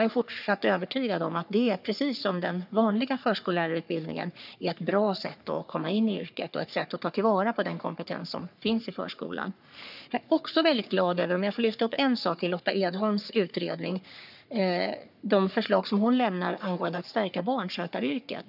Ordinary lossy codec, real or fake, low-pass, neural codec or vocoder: none; fake; 5.4 kHz; codec, 44.1 kHz, 3.4 kbps, Pupu-Codec